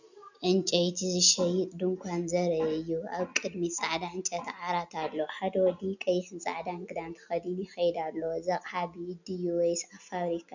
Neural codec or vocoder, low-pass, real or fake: none; 7.2 kHz; real